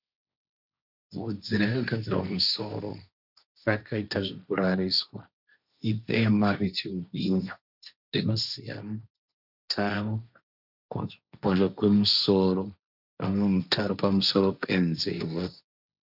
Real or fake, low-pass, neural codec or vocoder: fake; 5.4 kHz; codec, 16 kHz, 1.1 kbps, Voila-Tokenizer